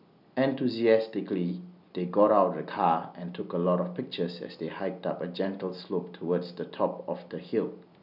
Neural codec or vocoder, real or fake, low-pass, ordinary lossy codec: none; real; 5.4 kHz; none